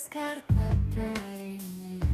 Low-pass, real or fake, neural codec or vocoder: 14.4 kHz; fake; codec, 44.1 kHz, 2.6 kbps, DAC